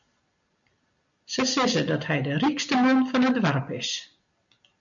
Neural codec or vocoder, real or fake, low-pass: none; real; 7.2 kHz